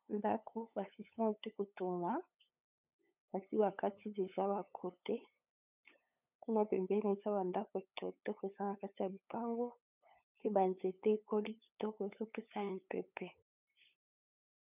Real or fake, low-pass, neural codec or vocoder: fake; 3.6 kHz; codec, 16 kHz, 8 kbps, FunCodec, trained on LibriTTS, 25 frames a second